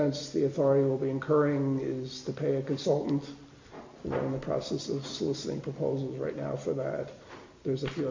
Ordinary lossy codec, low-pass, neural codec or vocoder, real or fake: MP3, 48 kbps; 7.2 kHz; none; real